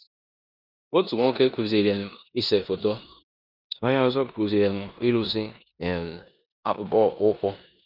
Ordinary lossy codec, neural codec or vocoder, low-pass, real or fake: none; codec, 16 kHz in and 24 kHz out, 0.9 kbps, LongCat-Audio-Codec, four codebook decoder; 5.4 kHz; fake